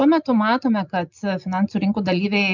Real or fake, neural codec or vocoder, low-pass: real; none; 7.2 kHz